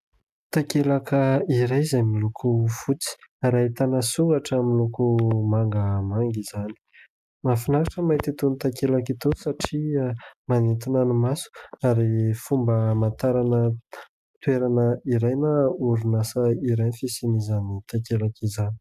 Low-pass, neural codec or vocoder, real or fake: 14.4 kHz; none; real